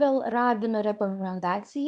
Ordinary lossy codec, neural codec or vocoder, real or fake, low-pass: MP3, 96 kbps; codec, 24 kHz, 0.9 kbps, WavTokenizer, small release; fake; 10.8 kHz